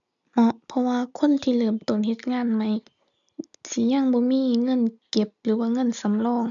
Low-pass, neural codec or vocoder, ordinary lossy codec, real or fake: 7.2 kHz; none; MP3, 96 kbps; real